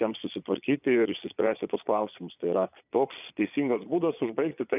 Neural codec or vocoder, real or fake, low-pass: vocoder, 22.05 kHz, 80 mel bands, Vocos; fake; 3.6 kHz